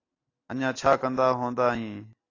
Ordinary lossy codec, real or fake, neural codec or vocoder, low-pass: AAC, 32 kbps; real; none; 7.2 kHz